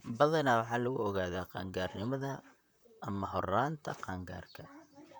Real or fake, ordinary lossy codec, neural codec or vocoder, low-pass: fake; none; vocoder, 44.1 kHz, 128 mel bands, Pupu-Vocoder; none